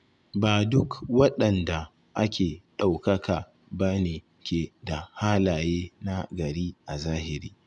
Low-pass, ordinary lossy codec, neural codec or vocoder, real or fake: 10.8 kHz; none; none; real